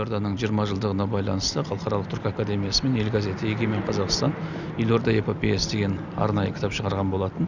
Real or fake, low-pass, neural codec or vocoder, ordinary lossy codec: real; 7.2 kHz; none; none